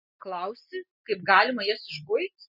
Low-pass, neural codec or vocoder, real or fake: 5.4 kHz; none; real